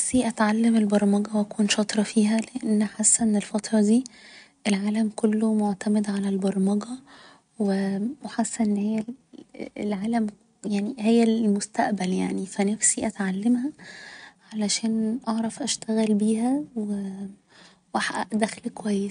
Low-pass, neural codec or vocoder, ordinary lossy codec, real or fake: 9.9 kHz; none; none; real